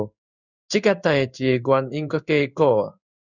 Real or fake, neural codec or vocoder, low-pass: fake; codec, 16 kHz in and 24 kHz out, 1 kbps, XY-Tokenizer; 7.2 kHz